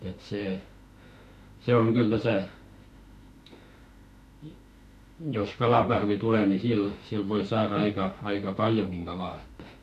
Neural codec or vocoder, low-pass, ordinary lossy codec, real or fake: autoencoder, 48 kHz, 32 numbers a frame, DAC-VAE, trained on Japanese speech; 14.4 kHz; none; fake